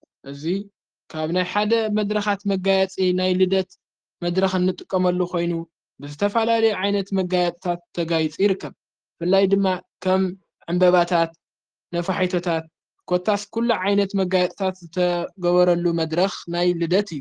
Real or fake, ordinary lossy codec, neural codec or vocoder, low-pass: real; Opus, 16 kbps; none; 9.9 kHz